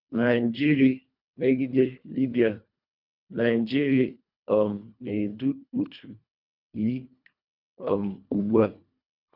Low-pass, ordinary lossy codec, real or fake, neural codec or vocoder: 5.4 kHz; none; fake; codec, 24 kHz, 1.5 kbps, HILCodec